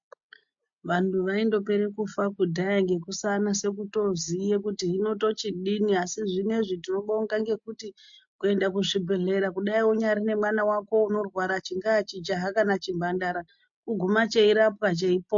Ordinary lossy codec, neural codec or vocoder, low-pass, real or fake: MP3, 48 kbps; none; 7.2 kHz; real